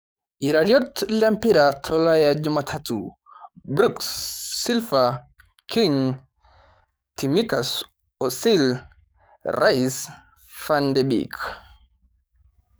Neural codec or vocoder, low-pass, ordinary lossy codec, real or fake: codec, 44.1 kHz, 7.8 kbps, DAC; none; none; fake